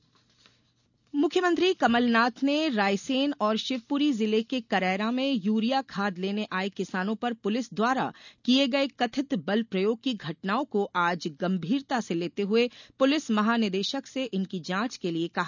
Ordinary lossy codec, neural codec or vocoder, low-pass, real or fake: none; none; 7.2 kHz; real